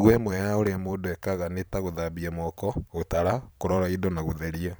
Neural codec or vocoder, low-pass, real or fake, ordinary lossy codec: vocoder, 44.1 kHz, 128 mel bands every 512 samples, BigVGAN v2; none; fake; none